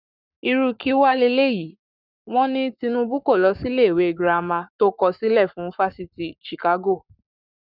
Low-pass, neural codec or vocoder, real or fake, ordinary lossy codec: 5.4 kHz; codec, 44.1 kHz, 7.8 kbps, Pupu-Codec; fake; none